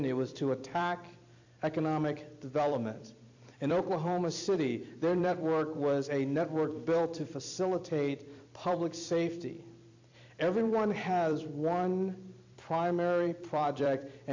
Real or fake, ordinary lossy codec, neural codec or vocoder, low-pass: fake; AAC, 48 kbps; vocoder, 44.1 kHz, 128 mel bands every 256 samples, BigVGAN v2; 7.2 kHz